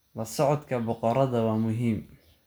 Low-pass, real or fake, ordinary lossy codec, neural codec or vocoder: none; real; none; none